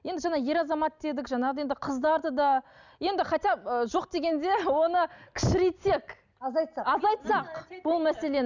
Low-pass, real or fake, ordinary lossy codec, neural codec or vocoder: 7.2 kHz; real; none; none